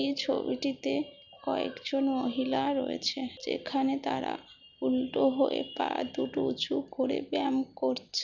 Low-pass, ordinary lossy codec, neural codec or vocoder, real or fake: 7.2 kHz; none; none; real